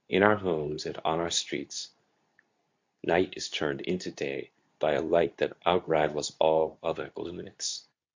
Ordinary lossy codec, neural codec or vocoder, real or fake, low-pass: MP3, 48 kbps; codec, 24 kHz, 0.9 kbps, WavTokenizer, medium speech release version 2; fake; 7.2 kHz